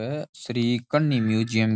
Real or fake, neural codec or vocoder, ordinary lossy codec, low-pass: real; none; none; none